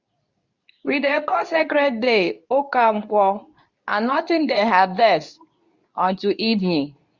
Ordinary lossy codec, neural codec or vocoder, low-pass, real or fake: Opus, 64 kbps; codec, 24 kHz, 0.9 kbps, WavTokenizer, medium speech release version 2; 7.2 kHz; fake